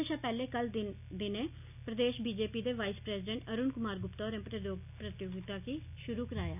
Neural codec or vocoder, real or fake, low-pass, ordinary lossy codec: none; real; 3.6 kHz; none